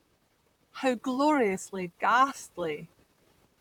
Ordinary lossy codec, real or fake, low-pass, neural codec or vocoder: none; fake; 19.8 kHz; vocoder, 44.1 kHz, 128 mel bands, Pupu-Vocoder